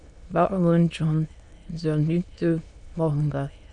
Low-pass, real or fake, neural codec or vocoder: 9.9 kHz; fake; autoencoder, 22.05 kHz, a latent of 192 numbers a frame, VITS, trained on many speakers